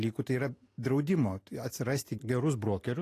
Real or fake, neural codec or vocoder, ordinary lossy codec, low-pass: real; none; AAC, 48 kbps; 14.4 kHz